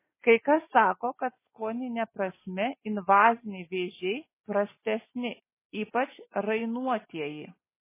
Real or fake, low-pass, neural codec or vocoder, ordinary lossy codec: real; 3.6 kHz; none; MP3, 16 kbps